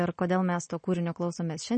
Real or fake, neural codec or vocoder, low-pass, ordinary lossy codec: real; none; 9.9 kHz; MP3, 32 kbps